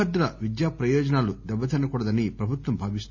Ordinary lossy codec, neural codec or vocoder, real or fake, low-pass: none; none; real; 7.2 kHz